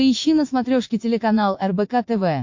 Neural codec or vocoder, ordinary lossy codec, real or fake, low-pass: vocoder, 44.1 kHz, 80 mel bands, Vocos; MP3, 48 kbps; fake; 7.2 kHz